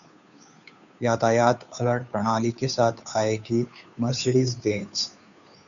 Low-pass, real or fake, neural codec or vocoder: 7.2 kHz; fake; codec, 16 kHz, 2 kbps, FunCodec, trained on Chinese and English, 25 frames a second